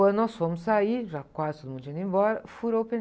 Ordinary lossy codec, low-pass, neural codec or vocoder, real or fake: none; none; none; real